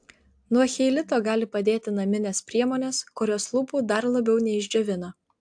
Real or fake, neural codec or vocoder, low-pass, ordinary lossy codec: real; none; 9.9 kHz; AAC, 64 kbps